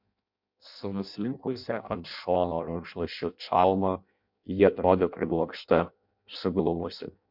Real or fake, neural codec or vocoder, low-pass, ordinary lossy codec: fake; codec, 16 kHz in and 24 kHz out, 0.6 kbps, FireRedTTS-2 codec; 5.4 kHz; MP3, 48 kbps